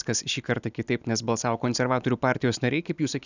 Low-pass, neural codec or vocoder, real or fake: 7.2 kHz; none; real